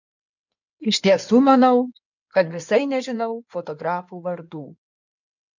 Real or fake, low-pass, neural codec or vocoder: fake; 7.2 kHz; codec, 16 kHz in and 24 kHz out, 2.2 kbps, FireRedTTS-2 codec